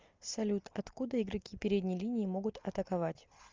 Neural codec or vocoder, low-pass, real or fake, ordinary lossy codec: none; 7.2 kHz; real; Opus, 32 kbps